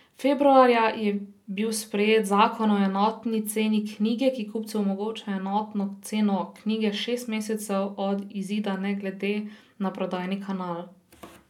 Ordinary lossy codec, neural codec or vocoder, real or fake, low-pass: none; none; real; 19.8 kHz